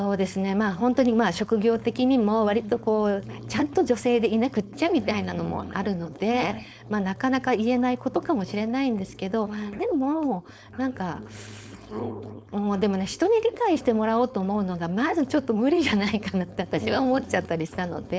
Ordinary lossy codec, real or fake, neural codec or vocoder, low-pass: none; fake; codec, 16 kHz, 4.8 kbps, FACodec; none